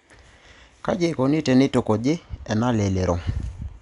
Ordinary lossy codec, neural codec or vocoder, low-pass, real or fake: none; none; 10.8 kHz; real